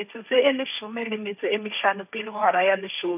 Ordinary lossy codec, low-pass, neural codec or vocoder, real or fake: none; 3.6 kHz; codec, 16 kHz, 1.1 kbps, Voila-Tokenizer; fake